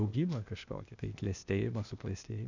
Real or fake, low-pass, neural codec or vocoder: fake; 7.2 kHz; codec, 16 kHz, 0.8 kbps, ZipCodec